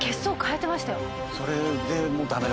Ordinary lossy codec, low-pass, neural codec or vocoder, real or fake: none; none; none; real